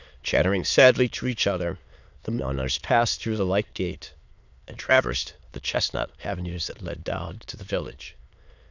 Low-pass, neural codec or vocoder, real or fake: 7.2 kHz; autoencoder, 22.05 kHz, a latent of 192 numbers a frame, VITS, trained on many speakers; fake